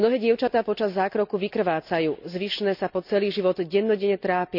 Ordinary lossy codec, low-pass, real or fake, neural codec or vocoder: none; 5.4 kHz; real; none